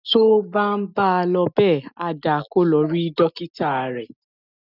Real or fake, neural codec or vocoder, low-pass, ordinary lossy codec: real; none; 5.4 kHz; none